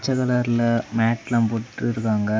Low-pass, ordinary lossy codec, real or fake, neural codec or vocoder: none; none; real; none